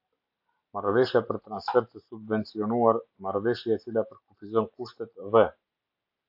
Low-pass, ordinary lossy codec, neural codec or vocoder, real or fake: 5.4 kHz; AAC, 48 kbps; none; real